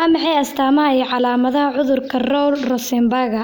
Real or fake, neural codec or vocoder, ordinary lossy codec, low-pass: real; none; none; none